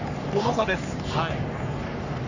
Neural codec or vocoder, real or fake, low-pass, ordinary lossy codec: codec, 44.1 kHz, 3.4 kbps, Pupu-Codec; fake; 7.2 kHz; none